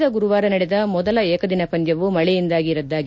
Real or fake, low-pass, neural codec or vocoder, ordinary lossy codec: real; none; none; none